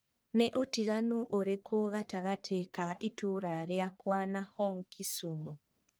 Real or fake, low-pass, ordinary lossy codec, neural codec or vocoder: fake; none; none; codec, 44.1 kHz, 1.7 kbps, Pupu-Codec